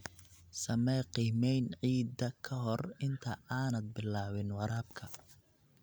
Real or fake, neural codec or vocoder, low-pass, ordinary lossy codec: real; none; none; none